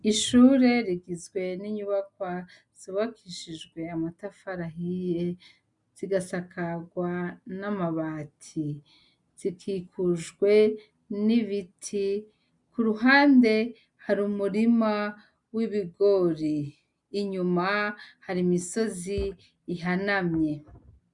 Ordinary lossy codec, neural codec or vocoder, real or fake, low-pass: MP3, 96 kbps; none; real; 10.8 kHz